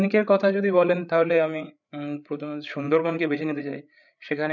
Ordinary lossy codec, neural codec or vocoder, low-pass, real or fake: none; codec, 16 kHz, 8 kbps, FreqCodec, larger model; 7.2 kHz; fake